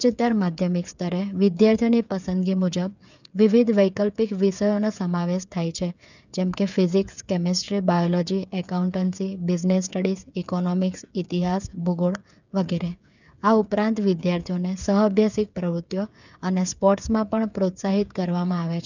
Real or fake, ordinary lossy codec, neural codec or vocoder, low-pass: fake; none; codec, 16 kHz, 8 kbps, FreqCodec, smaller model; 7.2 kHz